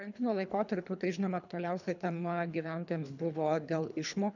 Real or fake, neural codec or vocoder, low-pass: fake; codec, 24 kHz, 3 kbps, HILCodec; 7.2 kHz